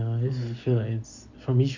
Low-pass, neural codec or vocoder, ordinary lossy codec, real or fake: 7.2 kHz; none; none; real